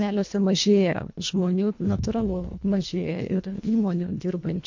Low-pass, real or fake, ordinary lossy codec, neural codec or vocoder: 7.2 kHz; fake; MP3, 48 kbps; codec, 24 kHz, 1.5 kbps, HILCodec